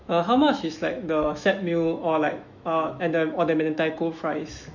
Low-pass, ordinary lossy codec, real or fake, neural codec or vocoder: 7.2 kHz; none; fake; autoencoder, 48 kHz, 128 numbers a frame, DAC-VAE, trained on Japanese speech